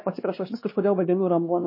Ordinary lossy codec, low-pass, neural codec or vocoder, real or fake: MP3, 24 kbps; 5.4 kHz; codec, 16 kHz, 2 kbps, X-Codec, WavLM features, trained on Multilingual LibriSpeech; fake